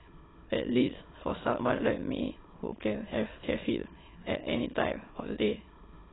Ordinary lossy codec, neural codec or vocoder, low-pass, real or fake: AAC, 16 kbps; autoencoder, 22.05 kHz, a latent of 192 numbers a frame, VITS, trained on many speakers; 7.2 kHz; fake